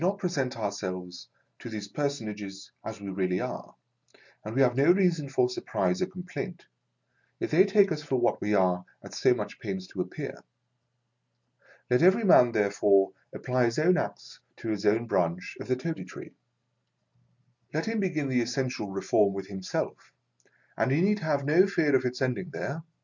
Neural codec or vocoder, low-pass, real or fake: none; 7.2 kHz; real